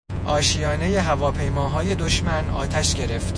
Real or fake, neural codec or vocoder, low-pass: fake; vocoder, 48 kHz, 128 mel bands, Vocos; 9.9 kHz